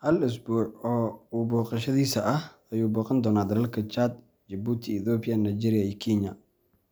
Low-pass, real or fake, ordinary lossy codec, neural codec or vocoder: none; real; none; none